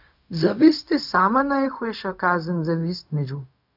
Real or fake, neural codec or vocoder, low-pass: fake; codec, 16 kHz, 0.4 kbps, LongCat-Audio-Codec; 5.4 kHz